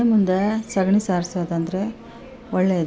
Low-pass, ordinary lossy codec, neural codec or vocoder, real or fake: none; none; none; real